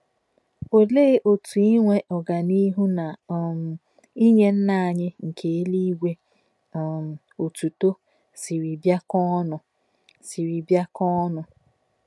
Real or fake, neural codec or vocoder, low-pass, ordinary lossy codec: real; none; none; none